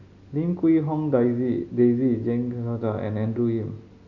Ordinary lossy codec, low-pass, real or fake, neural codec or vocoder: none; 7.2 kHz; real; none